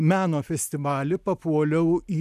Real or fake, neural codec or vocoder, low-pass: real; none; 14.4 kHz